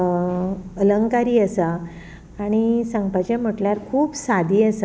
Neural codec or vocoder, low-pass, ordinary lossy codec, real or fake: none; none; none; real